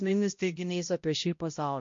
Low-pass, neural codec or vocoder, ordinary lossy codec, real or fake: 7.2 kHz; codec, 16 kHz, 0.5 kbps, X-Codec, HuBERT features, trained on balanced general audio; MP3, 48 kbps; fake